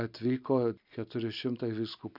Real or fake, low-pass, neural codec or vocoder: real; 5.4 kHz; none